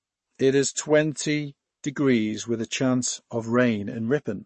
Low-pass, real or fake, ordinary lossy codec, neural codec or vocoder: 10.8 kHz; fake; MP3, 32 kbps; codec, 44.1 kHz, 7.8 kbps, Pupu-Codec